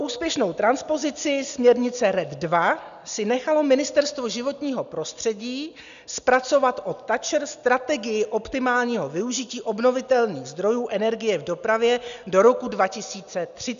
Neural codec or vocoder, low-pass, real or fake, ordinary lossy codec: none; 7.2 kHz; real; MP3, 96 kbps